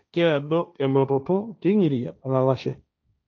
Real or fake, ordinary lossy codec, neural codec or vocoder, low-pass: fake; none; codec, 16 kHz, 1.1 kbps, Voila-Tokenizer; 7.2 kHz